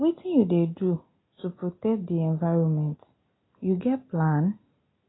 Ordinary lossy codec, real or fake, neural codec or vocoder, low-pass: AAC, 16 kbps; real; none; 7.2 kHz